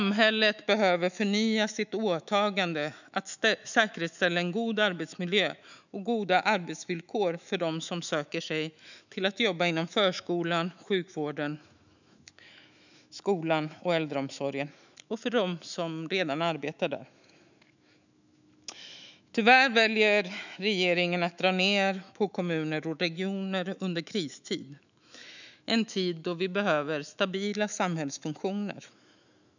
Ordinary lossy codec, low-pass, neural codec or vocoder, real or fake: none; 7.2 kHz; autoencoder, 48 kHz, 128 numbers a frame, DAC-VAE, trained on Japanese speech; fake